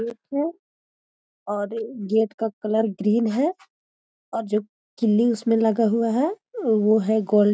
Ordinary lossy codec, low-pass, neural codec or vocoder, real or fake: none; none; none; real